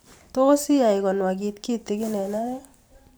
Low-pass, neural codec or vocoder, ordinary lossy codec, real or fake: none; vocoder, 44.1 kHz, 128 mel bands every 512 samples, BigVGAN v2; none; fake